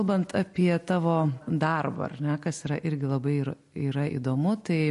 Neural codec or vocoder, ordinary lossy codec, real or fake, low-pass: none; MP3, 48 kbps; real; 14.4 kHz